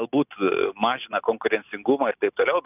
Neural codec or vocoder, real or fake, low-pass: none; real; 3.6 kHz